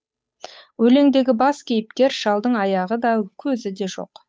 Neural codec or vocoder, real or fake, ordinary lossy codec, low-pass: codec, 16 kHz, 8 kbps, FunCodec, trained on Chinese and English, 25 frames a second; fake; none; none